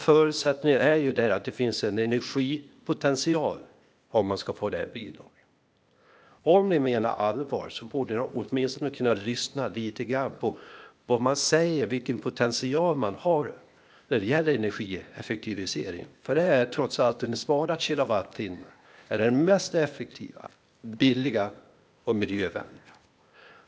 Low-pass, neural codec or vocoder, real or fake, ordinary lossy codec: none; codec, 16 kHz, 0.8 kbps, ZipCodec; fake; none